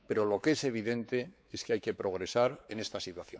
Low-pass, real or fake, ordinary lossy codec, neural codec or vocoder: none; fake; none; codec, 16 kHz, 4 kbps, X-Codec, WavLM features, trained on Multilingual LibriSpeech